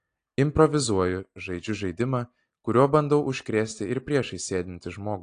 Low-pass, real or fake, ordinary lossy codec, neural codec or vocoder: 9.9 kHz; real; AAC, 48 kbps; none